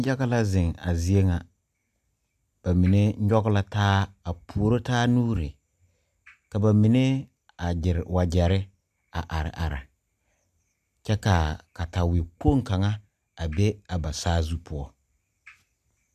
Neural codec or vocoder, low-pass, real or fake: none; 14.4 kHz; real